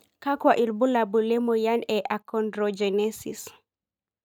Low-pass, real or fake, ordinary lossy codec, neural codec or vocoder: 19.8 kHz; real; none; none